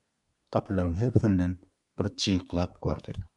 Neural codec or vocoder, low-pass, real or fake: codec, 24 kHz, 1 kbps, SNAC; 10.8 kHz; fake